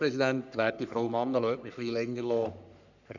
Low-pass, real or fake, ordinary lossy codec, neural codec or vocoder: 7.2 kHz; fake; none; codec, 44.1 kHz, 3.4 kbps, Pupu-Codec